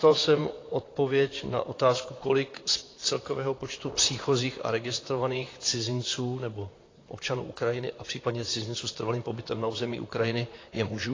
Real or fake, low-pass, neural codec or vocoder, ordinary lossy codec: fake; 7.2 kHz; vocoder, 44.1 kHz, 128 mel bands, Pupu-Vocoder; AAC, 32 kbps